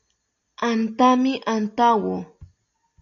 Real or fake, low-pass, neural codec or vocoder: real; 7.2 kHz; none